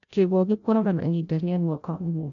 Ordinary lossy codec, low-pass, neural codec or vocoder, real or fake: none; 7.2 kHz; codec, 16 kHz, 0.5 kbps, FreqCodec, larger model; fake